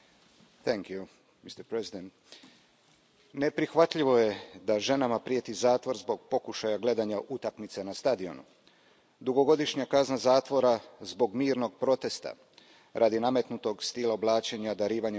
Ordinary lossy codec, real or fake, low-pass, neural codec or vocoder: none; real; none; none